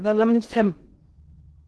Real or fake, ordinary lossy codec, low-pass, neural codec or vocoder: fake; Opus, 16 kbps; 10.8 kHz; codec, 16 kHz in and 24 kHz out, 0.6 kbps, FocalCodec, streaming, 2048 codes